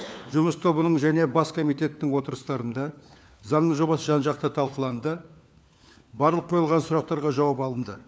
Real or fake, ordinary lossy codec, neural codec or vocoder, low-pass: fake; none; codec, 16 kHz, 4 kbps, FunCodec, trained on LibriTTS, 50 frames a second; none